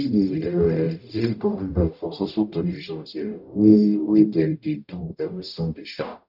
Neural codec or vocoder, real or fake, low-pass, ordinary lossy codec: codec, 44.1 kHz, 0.9 kbps, DAC; fake; 5.4 kHz; none